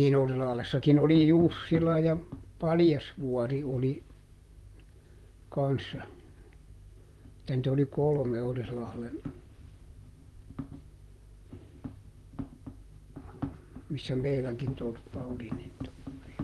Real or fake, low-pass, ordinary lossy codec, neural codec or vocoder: fake; 19.8 kHz; Opus, 32 kbps; vocoder, 44.1 kHz, 128 mel bands, Pupu-Vocoder